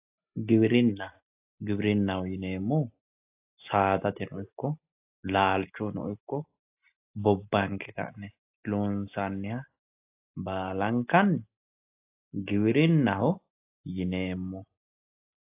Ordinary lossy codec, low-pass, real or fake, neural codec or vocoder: AAC, 24 kbps; 3.6 kHz; real; none